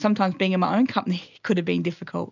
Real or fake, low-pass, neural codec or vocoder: real; 7.2 kHz; none